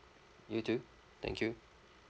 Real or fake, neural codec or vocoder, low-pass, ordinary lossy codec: real; none; none; none